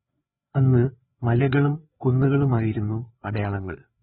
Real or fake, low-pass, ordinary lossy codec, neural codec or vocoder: fake; 7.2 kHz; AAC, 16 kbps; codec, 16 kHz, 4 kbps, FreqCodec, larger model